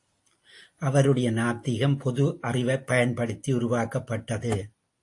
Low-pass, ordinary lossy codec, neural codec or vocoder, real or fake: 10.8 kHz; AAC, 48 kbps; none; real